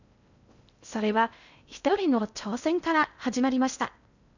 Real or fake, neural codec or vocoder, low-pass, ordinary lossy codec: fake; codec, 16 kHz in and 24 kHz out, 0.6 kbps, FocalCodec, streaming, 2048 codes; 7.2 kHz; none